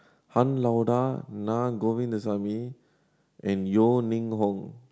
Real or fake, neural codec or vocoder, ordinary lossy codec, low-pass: real; none; none; none